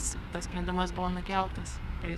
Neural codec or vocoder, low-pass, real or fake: codec, 32 kHz, 1.9 kbps, SNAC; 14.4 kHz; fake